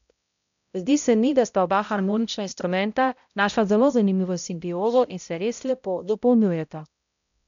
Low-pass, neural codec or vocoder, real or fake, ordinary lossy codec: 7.2 kHz; codec, 16 kHz, 0.5 kbps, X-Codec, HuBERT features, trained on balanced general audio; fake; none